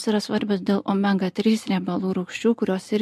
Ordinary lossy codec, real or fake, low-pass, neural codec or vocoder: MP3, 64 kbps; real; 14.4 kHz; none